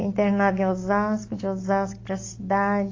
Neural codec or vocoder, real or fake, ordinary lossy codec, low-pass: none; real; AAC, 32 kbps; 7.2 kHz